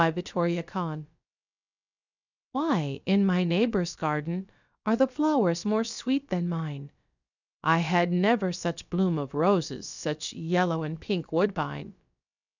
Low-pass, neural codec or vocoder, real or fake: 7.2 kHz; codec, 16 kHz, about 1 kbps, DyCAST, with the encoder's durations; fake